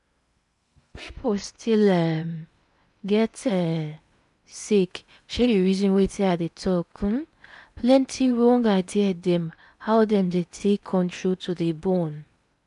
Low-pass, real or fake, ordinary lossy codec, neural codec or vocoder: 10.8 kHz; fake; none; codec, 16 kHz in and 24 kHz out, 0.8 kbps, FocalCodec, streaming, 65536 codes